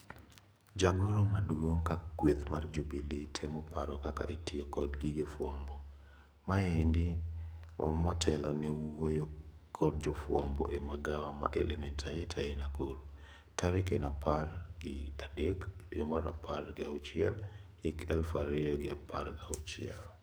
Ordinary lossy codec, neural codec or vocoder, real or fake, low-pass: none; codec, 44.1 kHz, 2.6 kbps, SNAC; fake; none